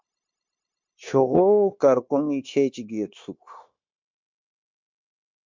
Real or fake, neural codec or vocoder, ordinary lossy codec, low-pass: fake; codec, 16 kHz, 0.9 kbps, LongCat-Audio-Codec; MP3, 64 kbps; 7.2 kHz